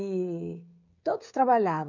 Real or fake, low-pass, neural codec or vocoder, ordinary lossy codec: fake; 7.2 kHz; codec, 16 kHz, 16 kbps, FreqCodec, smaller model; MP3, 64 kbps